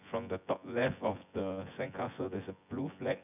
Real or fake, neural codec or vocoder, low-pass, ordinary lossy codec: fake; vocoder, 24 kHz, 100 mel bands, Vocos; 3.6 kHz; none